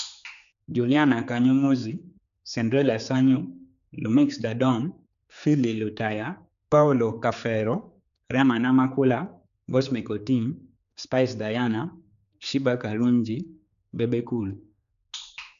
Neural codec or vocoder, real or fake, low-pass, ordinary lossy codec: codec, 16 kHz, 4 kbps, X-Codec, HuBERT features, trained on general audio; fake; 7.2 kHz; none